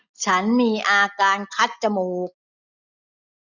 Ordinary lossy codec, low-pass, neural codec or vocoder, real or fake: none; 7.2 kHz; none; real